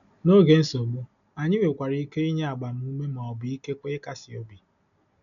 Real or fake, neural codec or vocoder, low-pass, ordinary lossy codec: real; none; 7.2 kHz; none